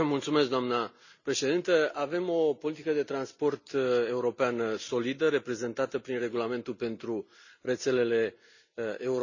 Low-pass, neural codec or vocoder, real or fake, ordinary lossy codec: 7.2 kHz; none; real; none